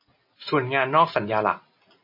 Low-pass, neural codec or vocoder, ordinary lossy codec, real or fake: 5.4 kHz; none; MP3, 24 kbps; real